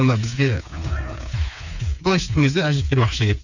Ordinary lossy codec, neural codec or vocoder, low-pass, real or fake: none; codec, 16 kHz, 4 kbps, FreqCodec, smaller model; 7.2 kHz; fake